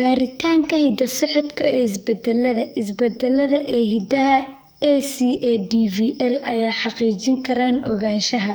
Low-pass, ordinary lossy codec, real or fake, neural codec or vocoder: none; none; fake; codec, 44.1 kHz, 2.6 kbps, SNAC